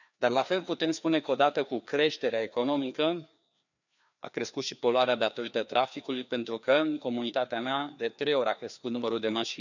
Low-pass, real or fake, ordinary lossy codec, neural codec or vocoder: 7.2 kHz; fake; none; codec, 16 kHz, 2 kbps, FreqCodec, larger model